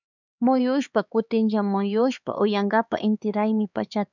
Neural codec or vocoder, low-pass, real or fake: codec, 16 kHz, 4 kbps, X-Codec, HuBERT features, trained on LibriSpeech; 7.2 kHz; fake